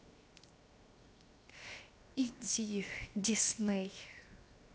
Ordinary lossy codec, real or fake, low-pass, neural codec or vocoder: none; fake; none; codec, 16 kHz, 0.7 kbps, FocalCodec